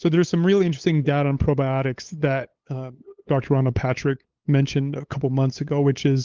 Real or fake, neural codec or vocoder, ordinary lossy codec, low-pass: fake; codec, 16 kHz, 8 kbps, FunCodec, trained on LibriTTS, 25 frames a second; Opus, 16 kbps; 7.2 kHz